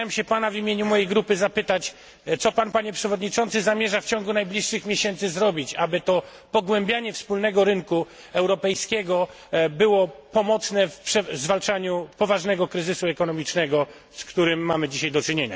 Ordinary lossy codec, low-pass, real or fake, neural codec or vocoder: none; none; real; none